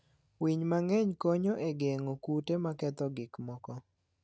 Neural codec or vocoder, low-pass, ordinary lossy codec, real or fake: none; none; none; real